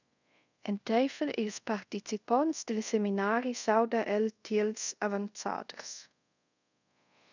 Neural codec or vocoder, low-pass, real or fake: codec, 24 kHz, 0.5 kbps, DualCodec; 7.2 kHz; fake